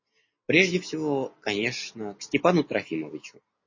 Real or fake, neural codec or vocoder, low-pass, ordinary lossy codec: real; none; 7.2 kHz; MP3, 32 kbps